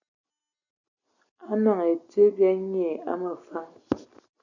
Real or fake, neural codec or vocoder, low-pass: real; none; 7.2 kHz